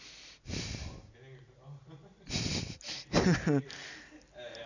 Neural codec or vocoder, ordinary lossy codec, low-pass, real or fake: none; none; 7.2 kHz; real